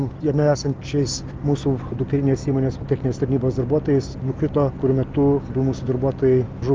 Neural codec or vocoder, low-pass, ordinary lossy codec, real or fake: none; 7.2 kHz; Opus, 24 kbps; real